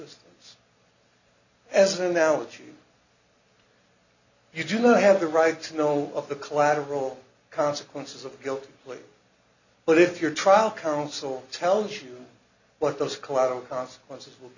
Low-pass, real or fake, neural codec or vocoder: 7.2 kHz; real; none